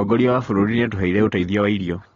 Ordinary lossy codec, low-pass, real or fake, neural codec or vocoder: AAC, 32 kbps; 7.2 kHz; real; none